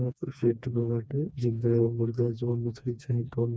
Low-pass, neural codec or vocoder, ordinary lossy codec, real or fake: none; codec, 16 kHz, 2 kbps, FreqCodec, smaller model; none; fake